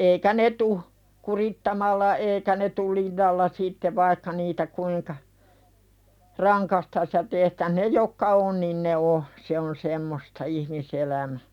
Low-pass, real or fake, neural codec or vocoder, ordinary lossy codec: 19.8 kHz; real; none; none